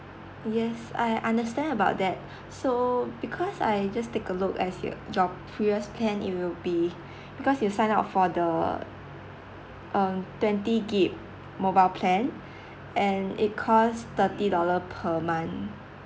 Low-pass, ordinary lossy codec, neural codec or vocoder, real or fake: none; none; none; real